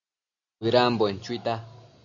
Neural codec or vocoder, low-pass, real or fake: none; 7.2 kHz; real